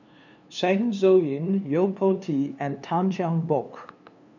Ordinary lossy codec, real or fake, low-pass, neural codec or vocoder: none; fake; 7.2 kHz; codec, 16 kHz, 2 kbps, FunCodec, trained on LibriTTS, 25 frames a second